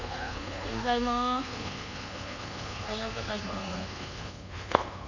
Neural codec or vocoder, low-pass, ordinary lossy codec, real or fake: codec, 24 kHz, 1.2 kbps, DualCodec; 7.2 kHz; none; fake